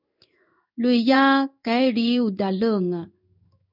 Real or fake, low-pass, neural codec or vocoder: fake; 5.4 kHz; codec, 16 kHz in and 24 kHz out, 1 kbps, XY-Tokenizer